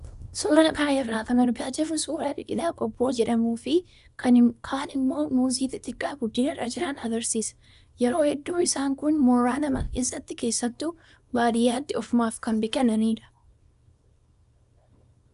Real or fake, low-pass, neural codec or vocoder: fake; 10.8 kHz; codec, 24 kHz, 0.9 kbps, WavTokenizer, small release